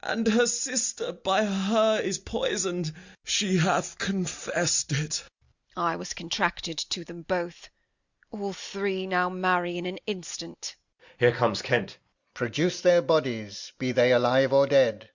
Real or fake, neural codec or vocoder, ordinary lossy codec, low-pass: real; none; Opus, 64 kbps; 7.2 kHz